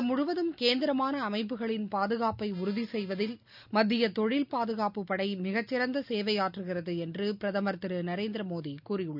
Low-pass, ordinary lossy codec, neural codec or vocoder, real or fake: 5.4 kHz; none; none; real